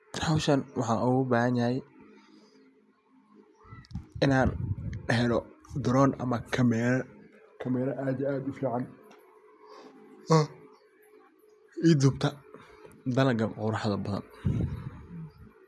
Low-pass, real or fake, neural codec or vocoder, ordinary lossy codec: none; real; none; none